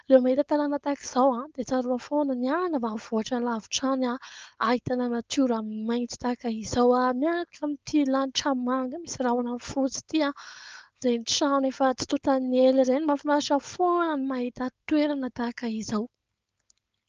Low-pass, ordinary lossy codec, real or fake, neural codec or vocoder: 7.2 kHz; Opus, 24 kbps; fake; codec, 16 kHz, 4.8 kbps, FACodec